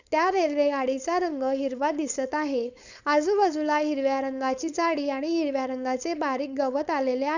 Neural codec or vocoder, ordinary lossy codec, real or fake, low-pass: codec, 16 kHz, 4.8 kbps, FACodec; none; fake; 7.2 kHz